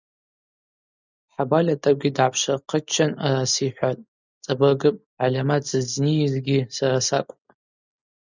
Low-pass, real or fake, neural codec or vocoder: 7.2 kHz; real; none